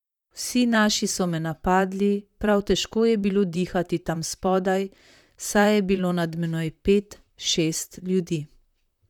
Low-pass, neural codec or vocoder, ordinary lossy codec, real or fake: 19.8 kHz; vocoder, 44.1 kHz, 128 mel bands, Pupu-Vocoder; none; fake